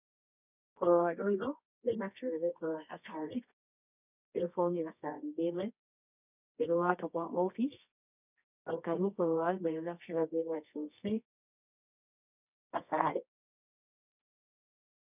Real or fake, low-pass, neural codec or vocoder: fake; 3.6 kHz; codec, 24 kHz, 0.9 kbps, WavTokenizer, medium music audio release